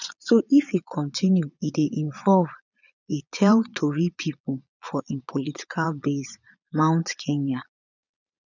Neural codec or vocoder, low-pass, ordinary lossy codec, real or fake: vocoder, 22.05 kHz, 80 mel bands, Vocos; 7.2 kHz; none; fake